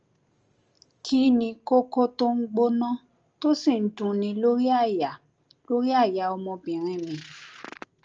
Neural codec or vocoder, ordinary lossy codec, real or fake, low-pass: none; Opus, 24 kbps; real; 7.2 kHz